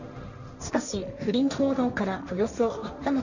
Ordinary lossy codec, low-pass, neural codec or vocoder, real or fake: none; 7.2 kHz; codec, 16 kHz, 1.1 kbps, Voila-Tokenizer; fake